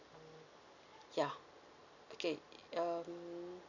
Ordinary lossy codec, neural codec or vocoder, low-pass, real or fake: none; none; 7.2 kHz; real